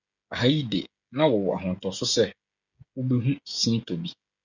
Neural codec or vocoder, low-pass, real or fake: codec, 16 kHz, 16 kbps, FreqCodec, smaller model; 7.2 kHz; fake